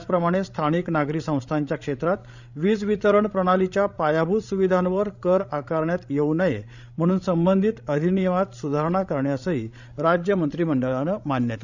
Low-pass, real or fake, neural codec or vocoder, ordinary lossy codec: 7.2 kHz; fake; codec, 16 kHz, 16 kbps, FreqCodec, larger model; none